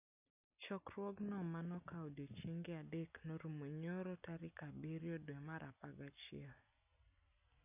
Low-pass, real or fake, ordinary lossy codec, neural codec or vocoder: 3.6 kHz; real; none; none